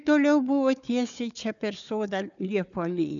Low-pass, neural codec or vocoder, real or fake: 7.2 kHz; codec, 16 kHz, 4 kbps, FunCodec, trained on Chinese and English, 50 frames a second; fake